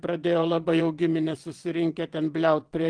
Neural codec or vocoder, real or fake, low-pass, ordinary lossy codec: vocoder, 22.05 kHz, 80 mel bands, WaveNeXt; fake; 9.9 kHz; Opus, 24 kbps